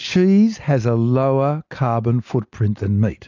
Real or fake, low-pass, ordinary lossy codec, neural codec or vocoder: real; 7.2 kHz; MP3, 64 kbps; none